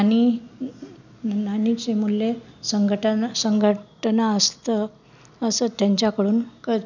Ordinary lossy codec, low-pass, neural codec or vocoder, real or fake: none; 7.2 kHz; none; real